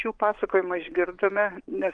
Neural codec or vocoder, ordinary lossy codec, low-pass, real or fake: vocoder, 24 kHz, 100 mel bands, Vocos; Opus, 24 kbps; 9.9 kHz; fake